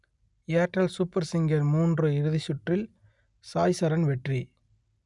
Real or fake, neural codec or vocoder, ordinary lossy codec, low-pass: real; none; none; 10.8 kHz